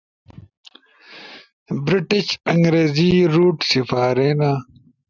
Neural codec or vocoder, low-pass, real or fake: none; 7.2 kHz; real